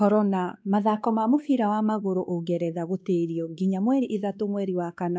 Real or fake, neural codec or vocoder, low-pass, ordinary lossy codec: fake; codec, 16 kHz, 2 kbps, X-Codec, WavLM features, trained on Multilingual LibriSpeech; none; none